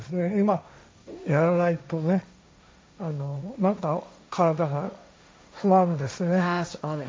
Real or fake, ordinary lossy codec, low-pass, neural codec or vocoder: fake; none; none; codec, 16 kHz, 1.1 kbps, Voila-Tokenizer